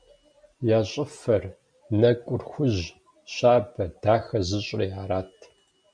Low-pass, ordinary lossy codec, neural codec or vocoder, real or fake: 9.9 kHz; MP3, 96 kbps; none; real